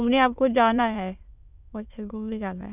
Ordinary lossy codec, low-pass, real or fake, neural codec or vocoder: none; 3.6 kHz; fake; autoencoder, 22.05 kHz, a latent of 192 numbers a frame, VITS, trained on many speakers